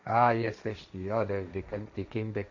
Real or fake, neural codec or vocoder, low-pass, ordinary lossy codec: fake; codec, 16 kHz, 1.1 kbps, Voila-Tokenizer; 7.2 kHz; MP3, 64 kbps